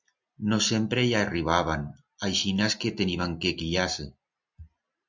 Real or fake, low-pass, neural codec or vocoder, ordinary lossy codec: real; 7.2 kHz; none; MP3, 64 kbps